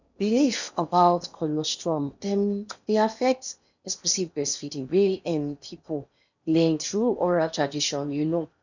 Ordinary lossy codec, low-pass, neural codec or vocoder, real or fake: none; 7.2 kHz; codec, 16 kHz in and 24 kHz out, 0.8 kbps, FocalCodec, streaming, 65536 codes; fake